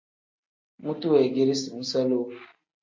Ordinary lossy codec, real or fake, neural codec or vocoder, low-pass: MP3, 48 kbps; real; none; 7.2 kHz